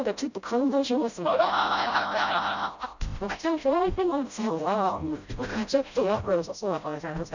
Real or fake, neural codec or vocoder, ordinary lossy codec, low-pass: fake; codec, 16 kHz, 0.5 kbps, FreqCodec, smaller model; none; 7.2 kHz